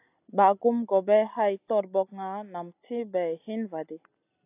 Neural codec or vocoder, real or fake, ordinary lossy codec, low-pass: none; real; AAC, 32 kbps; 3.6 kHz